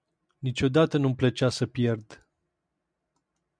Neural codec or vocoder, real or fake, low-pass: none; real; 9.9 kHz